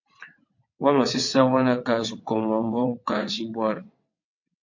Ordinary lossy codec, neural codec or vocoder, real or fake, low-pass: MP3, 48 kbps; vocoder, 22.05 kHz, 80 mel bands, WaveNeXt; fake; 7.2 kHz